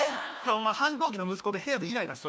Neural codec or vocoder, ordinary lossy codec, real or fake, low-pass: codec, 16 kHz, 1 kbps, FunCodec, trained on LibriTTS, 50 frames a second; none; fake; none